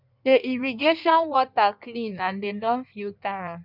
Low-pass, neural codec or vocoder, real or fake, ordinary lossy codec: 5.4 kHz; codec, 16 kHz in and 24 kHz out, 1.1 kbps, FireRedTTS-2 codec; fake; none